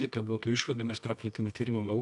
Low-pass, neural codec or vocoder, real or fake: 10.8 kHz; codec, 24 kHz, 0.9 kbps, WavTokenizer, medium music audio release; fake